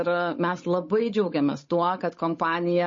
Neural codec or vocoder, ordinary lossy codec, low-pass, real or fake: codec, 16 kHz, 8 kbps, FunCodec, trained on Chinese and English, 25 frames a second; MP3, 32 kbps; 7.2 kHz; fake